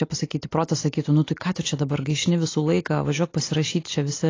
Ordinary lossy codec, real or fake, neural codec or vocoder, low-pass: AAC, 32 kbps; real; none; 7.2 kHz